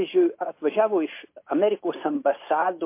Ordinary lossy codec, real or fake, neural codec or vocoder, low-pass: MP3, 24 kbps; real; none; 3.6 kHz